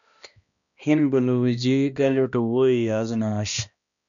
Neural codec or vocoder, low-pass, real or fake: codec, 16 kHz, 1 kbps, X-Codec, HuBERT features, trained on balanced general audio; 7.2 kHz; fake